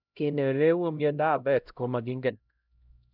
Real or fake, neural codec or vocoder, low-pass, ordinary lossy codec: fake; codec, 16 kHz, 0.5 kbps, X-Codec, HuBERT features, trained on LibriSpeech; 5.4 kHz; none